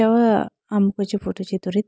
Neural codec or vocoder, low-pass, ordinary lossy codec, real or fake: none; none; none; real